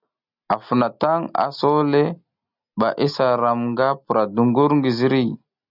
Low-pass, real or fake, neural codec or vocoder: 5.4 kHz; real; none